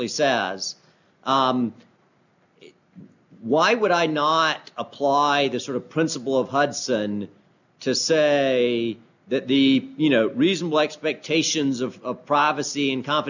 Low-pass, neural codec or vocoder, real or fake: 7.2 kHz; none; real